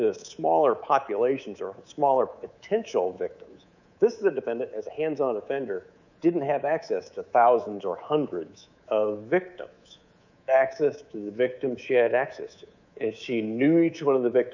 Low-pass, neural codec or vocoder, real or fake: 7.2 kHz; codec, 24 kHz, 3.1 kbps, DualCodec; fake